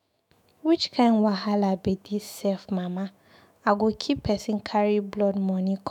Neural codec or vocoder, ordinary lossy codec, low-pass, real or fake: autoencoder, 48 kHz, 128 numbers a frame, DAC-VAE, trained on Japanese speech; none; 19.8 kHz; fake